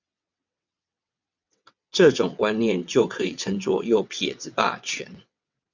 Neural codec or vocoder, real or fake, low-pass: vocoder, 22.05 kHz, 80 mel bands, WaveNeXt; fake; 7.2 kHz